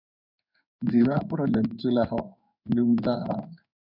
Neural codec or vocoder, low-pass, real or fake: codec, 16 kHz in and 24 kHz out, 1 kbps, XY-Tokenizer; 5.4 kHz; fake